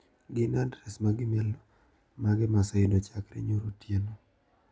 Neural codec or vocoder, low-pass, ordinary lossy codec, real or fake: none; none; none; real